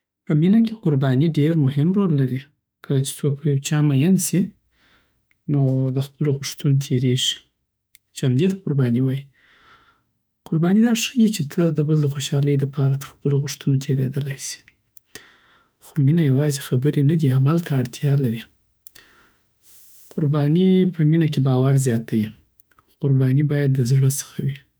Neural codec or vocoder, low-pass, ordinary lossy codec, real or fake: autoencoder, 48 kHz, 32 numbers a frame, DAC-VAE, trained on Japanese speech; none; none; fake